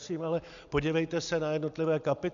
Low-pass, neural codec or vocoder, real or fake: 7.2 kHz; none; real